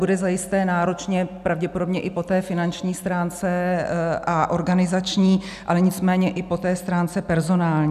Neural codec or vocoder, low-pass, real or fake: none; 14.4 kHz; real